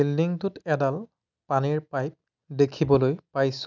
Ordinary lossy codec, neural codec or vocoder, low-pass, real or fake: none; none; 7.2 kHz; real